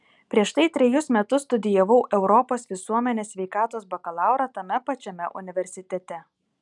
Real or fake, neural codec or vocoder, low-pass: real; none; 10.8 kHz